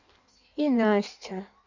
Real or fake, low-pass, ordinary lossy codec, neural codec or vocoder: fake; 7.2 kHz; none; codec, 16 kHz in and 24 kHz out, 1.1 kbps, FireRedTTS-2 codec